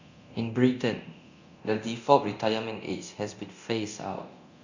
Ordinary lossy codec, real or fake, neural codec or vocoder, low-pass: none; fake; codec, 24 kHz, 0.9 kbps, DualCodec; 7.2 kHz